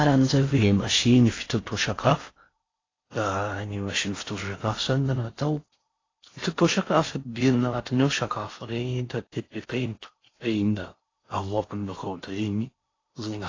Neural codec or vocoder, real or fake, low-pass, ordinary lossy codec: codec, 16 kHz in and 24 kHz out, 0.6 kbps, FocalCodec, streaming, 4096 codes; fake; 7.2 kHz; AAC, 32 kbps